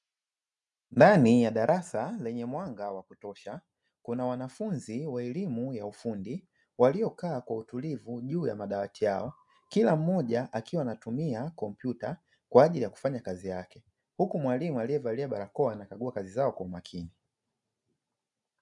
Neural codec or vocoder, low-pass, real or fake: none; 10.8 kHz; real